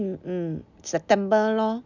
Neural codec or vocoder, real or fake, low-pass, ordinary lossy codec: none; real; 7.2 kHz; none